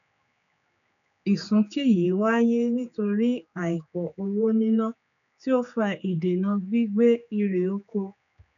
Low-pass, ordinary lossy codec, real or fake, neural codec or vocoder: 7.2 kHz; none; fake; codec, 16 kHz, 4 kbps, X-Codec, HuBERT features, trained on general audio